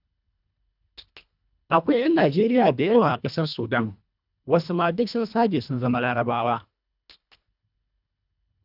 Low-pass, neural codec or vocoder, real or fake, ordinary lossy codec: 5.4 kHz; codec, 24 kHz, 1.5 kbps, HILCodec; fake; none